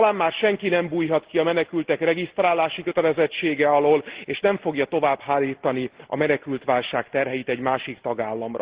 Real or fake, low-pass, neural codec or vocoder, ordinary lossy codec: real; 3.6 kHz; none; Opus, 16 kbps